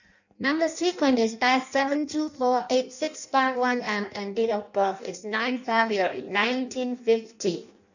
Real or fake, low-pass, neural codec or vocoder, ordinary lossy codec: fake; 7.2 kHz; codec, 16 kHz in and 24 kHz out, 0.6 kbps, FireRedTTS-2 codec; none